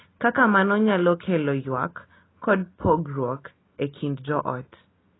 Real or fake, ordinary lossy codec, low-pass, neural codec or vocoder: real; AAC, 16 kbps; 7.2 kHz; none